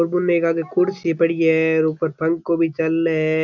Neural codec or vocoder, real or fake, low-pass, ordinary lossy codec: none; real; 7.2 kHz; none